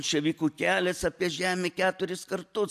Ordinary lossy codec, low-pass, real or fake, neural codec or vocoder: AAC, 96 kbps; 14.4 kHz; real; none